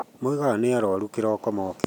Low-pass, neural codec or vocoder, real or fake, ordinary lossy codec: 19.8 kHz; none; real; none